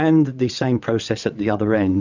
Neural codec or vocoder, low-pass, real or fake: vocoder, 22.05 kHz, 80 mel bands, WaveNeXt; 7.2 kHz; fake